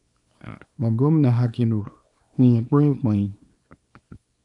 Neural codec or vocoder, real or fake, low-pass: codec, 24 kHz, 0.9 kbps, WavTokenizer, small release; fake; 10.8 kHz